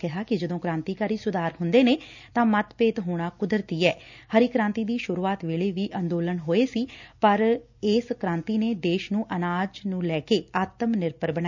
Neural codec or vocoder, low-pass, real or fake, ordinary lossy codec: none; 7.2 kHz; real; none